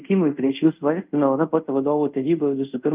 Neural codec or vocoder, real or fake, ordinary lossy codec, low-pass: codec, 24 kHz, 0.5 kbps, DualCodec; fake; Opus, 24 kbps; 3.6 kHz